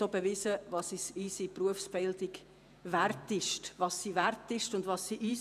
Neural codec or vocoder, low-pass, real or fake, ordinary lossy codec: vocoder, 48 kHz, 128 mel bands, Vocos; 14.4 kHz; fake; none